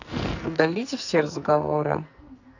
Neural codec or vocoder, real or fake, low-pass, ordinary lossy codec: codec, 44.1 kHz, 2.6 kbps, SNAC; fake; 7.2 kHz; none